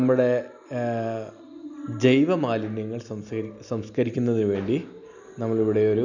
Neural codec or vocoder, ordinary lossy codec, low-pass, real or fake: none; none; 7.2 kHz; real